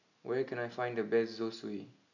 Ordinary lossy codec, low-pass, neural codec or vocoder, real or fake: none; 7.2 kHz; none; real